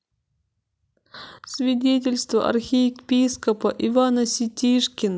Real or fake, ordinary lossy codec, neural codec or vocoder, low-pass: real; none; none; none